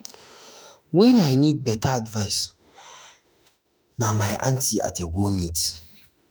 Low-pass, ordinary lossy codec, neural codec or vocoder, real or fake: none; none; autoencoder, 48 kHz, 32 numbers a frame, DAC-VAE, trained on Japanese speech; fake